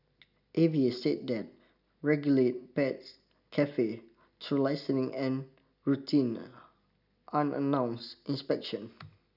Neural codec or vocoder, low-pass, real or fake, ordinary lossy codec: none; 5.4 kHz; real; none